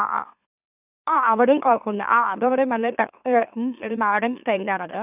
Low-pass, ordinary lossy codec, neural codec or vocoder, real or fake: 3.6 kHz; none; autoencoder, 44.1 kHz, a latent of 192 numbers a frame, MeloTTS; fake